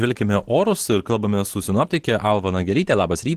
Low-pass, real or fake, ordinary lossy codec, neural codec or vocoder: 14.4 kHz; fake; Opus, 16 kbps; vocoder, 44.1 kHz, 128 mel bands every 512 samples, BigVGAN v2